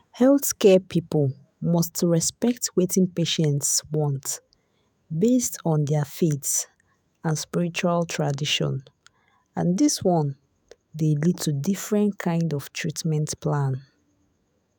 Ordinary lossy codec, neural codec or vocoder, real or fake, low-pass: none; autoencoder, 48 kHz, 128 numbers a frame, DAC-VAE, trained on Japanese speech; fake; none